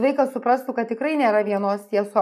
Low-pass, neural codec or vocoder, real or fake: 14.4 kHz; none; real